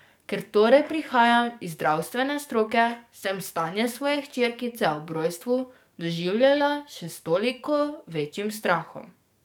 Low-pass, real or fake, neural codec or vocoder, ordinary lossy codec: 19.8 kHz; fake; codec, 44.1 kHz, 7.8 kbps, DAC; none